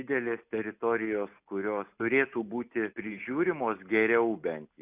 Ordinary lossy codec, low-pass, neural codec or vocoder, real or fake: Opus, 64 kbps; 3.6 kHz; none; real